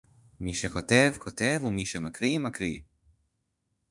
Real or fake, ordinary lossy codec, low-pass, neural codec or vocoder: fake; MP3, 96 kbps; 10.8 kHz; autoencoder, 48 kHz, 32 numbers a frame, DAC-VAE, trained on Japanese speech